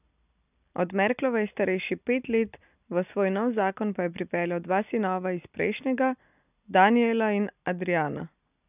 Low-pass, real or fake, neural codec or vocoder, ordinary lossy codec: 3.6 kHz; real; none; none